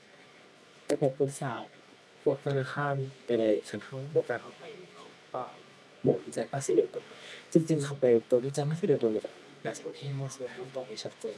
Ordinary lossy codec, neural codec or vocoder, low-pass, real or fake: none; codec, 24 kHz, 0.9 kbps, WavTokenizer, medium music audio release; none; fake